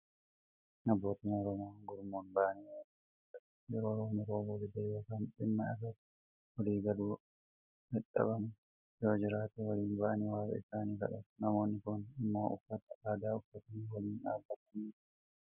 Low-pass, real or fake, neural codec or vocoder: 3.6 kHz; real; none